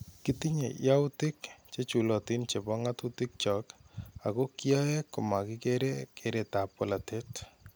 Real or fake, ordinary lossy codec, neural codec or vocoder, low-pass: real; none; none; none